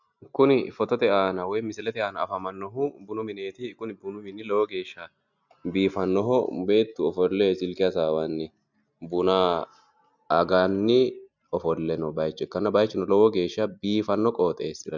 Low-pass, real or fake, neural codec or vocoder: 7.2 kHz; real; none